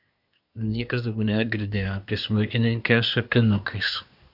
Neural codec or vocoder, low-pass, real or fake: codec, 24 kHz, 1 kbps, SNAC; 5.4 kHz; fake